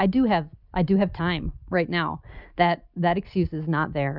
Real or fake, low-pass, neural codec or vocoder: real; 5.4 kHz; none